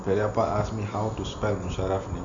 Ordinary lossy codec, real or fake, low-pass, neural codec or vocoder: MP3, 64 kbps; real; 7.2 kHz; none